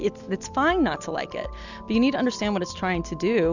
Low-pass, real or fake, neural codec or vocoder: 7.2 kHz; real; none